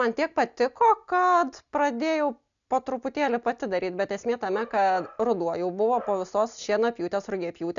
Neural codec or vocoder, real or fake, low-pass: none; real; 7.2 kHz